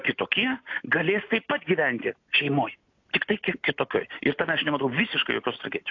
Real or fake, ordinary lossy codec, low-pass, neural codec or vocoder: real; AAC, 48 kbps; 7.2 kHz; none